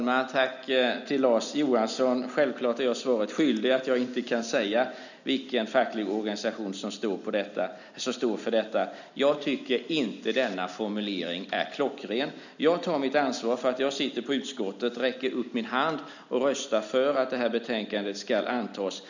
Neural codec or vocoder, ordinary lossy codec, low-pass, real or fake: none; none; 7.2 kHz; real